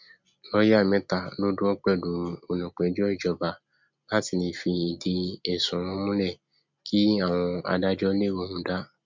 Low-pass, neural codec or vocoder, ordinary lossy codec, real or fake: 7.2 kHz; none; MP3, 64 kbps; real